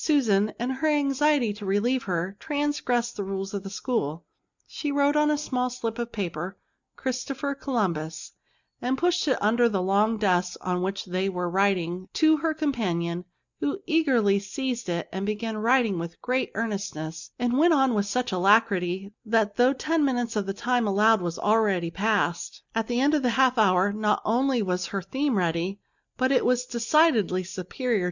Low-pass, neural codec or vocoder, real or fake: 7.2 kHz; none; real